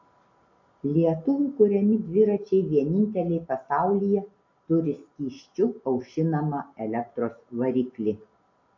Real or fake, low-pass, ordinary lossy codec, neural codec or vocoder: real; 7.2 kHz; AAC, 48 kbps; none